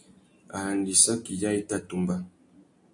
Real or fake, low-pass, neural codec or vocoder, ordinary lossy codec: real; 10.8 kHz; none; AAC, 64 kbps